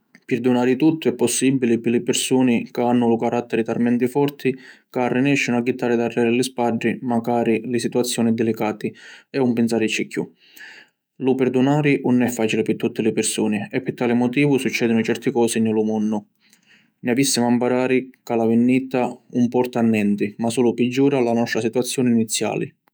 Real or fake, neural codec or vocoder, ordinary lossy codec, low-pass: fake; autoencoder, 48 kHz, 128 numbers a frame, DAC-VAE, trained on Japanese speech; none; none